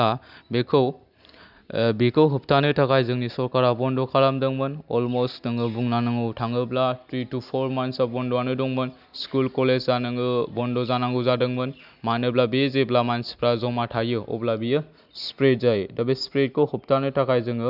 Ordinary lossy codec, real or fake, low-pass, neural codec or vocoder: none; real; 5.4 kHz; none